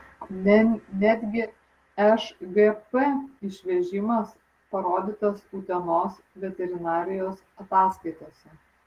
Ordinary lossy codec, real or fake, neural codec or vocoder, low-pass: Opus, 16 kbps; real; none; 14.4 kHz